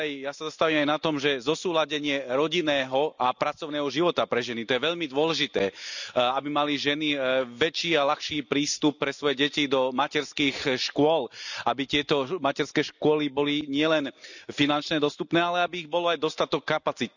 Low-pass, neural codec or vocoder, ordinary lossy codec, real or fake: 7.2 kHz; none; none; real